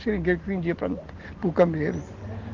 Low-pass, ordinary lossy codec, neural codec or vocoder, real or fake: 7.2 kHz; Opus, 16 kbps; none; real